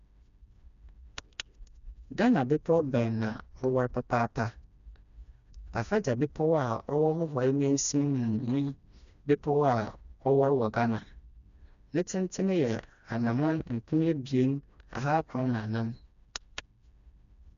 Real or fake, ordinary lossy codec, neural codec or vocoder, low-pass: fake; none; codec, 16 kHz, 1 kbps, FreqCodec, smaller model; 7.2 kHz